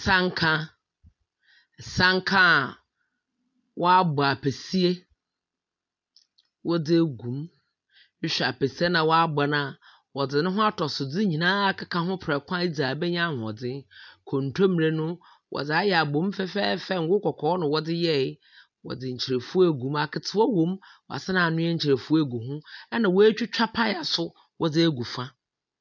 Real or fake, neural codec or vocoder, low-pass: real; none; 7.2 kHz